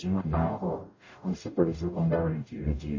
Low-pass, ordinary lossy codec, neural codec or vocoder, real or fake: 7.2 kHz; MP3, 32 kbps; codec, 44.1 kHz, 0.9 kbps, DAC; fake